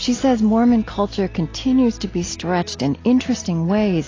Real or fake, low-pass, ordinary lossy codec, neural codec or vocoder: real; 7.2 kHz; AAC, 32 kbps; none